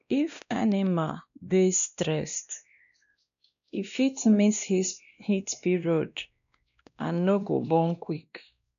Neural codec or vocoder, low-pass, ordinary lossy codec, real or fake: codec, 16 kHz, 1 kbps, X-Codec, WavLM features, trained on Multilingual LibriSpeech; 7.2 kHz; none; fake